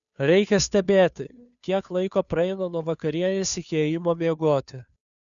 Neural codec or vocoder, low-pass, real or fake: codec, 16 kHz, 2 kbps, FunCodec, trained on Chinese and English, 25 frames a second; 7.2 kHz; fake